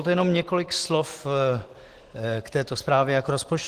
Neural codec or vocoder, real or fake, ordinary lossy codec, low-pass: none; real; Opus, 16 kbps; 14.4 kHz